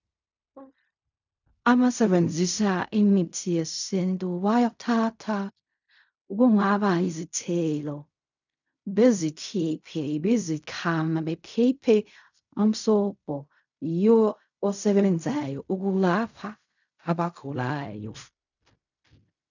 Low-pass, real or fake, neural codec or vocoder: 7.2 kHz; fake; codec, 16 kHz in and 24 kHz out, 0.4 kbps, LongCat-Audio-Codec, fine tuned four codebook decoder